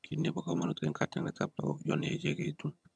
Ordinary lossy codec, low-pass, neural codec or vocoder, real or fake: none; none; vocoder, 22.05 kHz, 80 mel bands, HiFi-GAN; fake